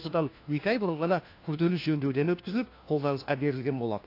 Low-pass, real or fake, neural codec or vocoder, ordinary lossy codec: 5.4 kHz; fake; codec, 16 kHz, 1 kbps, FunCodec, trained on LibriTTS, 50 frames a second; AAC, 32 kbps